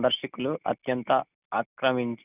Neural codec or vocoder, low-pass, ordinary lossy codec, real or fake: none; 3.6 kHz; none; real